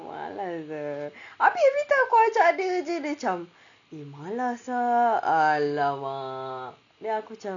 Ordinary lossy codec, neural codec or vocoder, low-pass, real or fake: none; none; 7.2 kHz; real